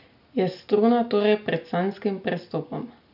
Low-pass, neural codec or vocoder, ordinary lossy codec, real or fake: 5.4 kHz; none; none; real